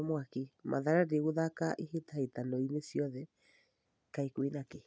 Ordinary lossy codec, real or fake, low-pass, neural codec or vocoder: none; real; none; none